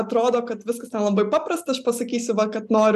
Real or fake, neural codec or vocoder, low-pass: real; none; 14.4 kHz